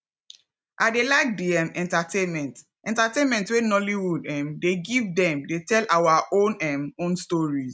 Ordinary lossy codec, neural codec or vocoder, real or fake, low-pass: none; none; real; none